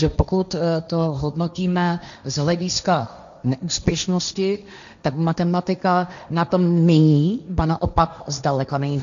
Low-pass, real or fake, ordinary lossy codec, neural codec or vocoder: 7.2 kHz; fake; AAC, 96 kbps; codec, 16 kHz, 1.1 kbps, Voila-Tokenizer